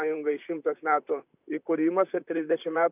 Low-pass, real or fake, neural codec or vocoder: 3.6 kHz; fake; vocoder, 44.1 kHz, 128 mel bands, Pupu-Vocoder